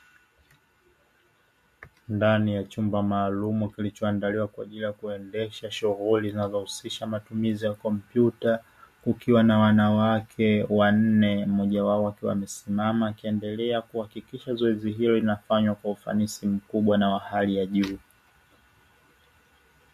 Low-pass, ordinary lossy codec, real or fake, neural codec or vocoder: 14.4 kHz; MP3, 64 kbps; real; none